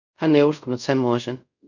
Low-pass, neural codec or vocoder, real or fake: 7.2 kHz; codec, 24 kHz, 0.5 kbps, DualCodec; fake